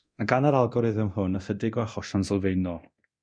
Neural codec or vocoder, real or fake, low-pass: codec, 24 kHz, 0.9 kbps, DualCodec; fake; 9.9 kHz